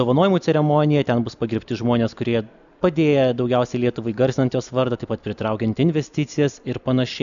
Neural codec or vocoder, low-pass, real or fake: none; 7.2 kHz; real